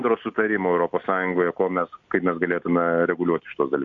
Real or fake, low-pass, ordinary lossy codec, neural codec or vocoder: real; 7.2 kHz; AAC, 64 kbps; none